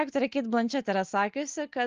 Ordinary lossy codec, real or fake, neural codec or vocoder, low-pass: Opus, 32 kbps; real; none; 7.2 kHz